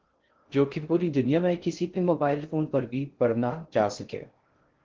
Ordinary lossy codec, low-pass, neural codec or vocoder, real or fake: Opus, 16 kbps; 7.2 kHz; codec, 16 kHz in and 24 kHz out, 0.6 kbps, FocalCodec, streaming, 2048 codes; fake